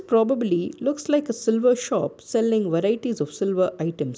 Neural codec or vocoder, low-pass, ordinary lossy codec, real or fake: none; none; none; real